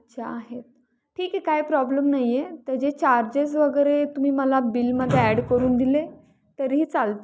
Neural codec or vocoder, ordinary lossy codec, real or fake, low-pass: none; none; real; none